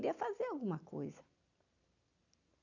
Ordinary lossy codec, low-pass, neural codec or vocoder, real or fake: none; 7.2 kHz; none; real